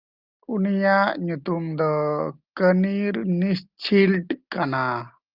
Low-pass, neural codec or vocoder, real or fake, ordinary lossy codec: 5.4 kHz; none; real; Opus, 24 kbps